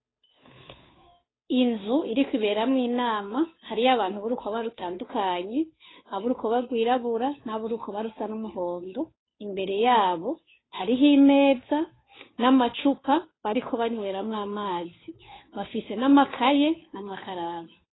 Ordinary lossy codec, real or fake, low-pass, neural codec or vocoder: AAC, 16 kbps; fake; 7.2 kHz; codec, 16 kHz, 2 kbps, FunCodec, trained on Chinese and English, 25 frames a second